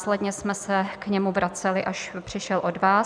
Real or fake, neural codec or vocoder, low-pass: real; none; 9.9 kHz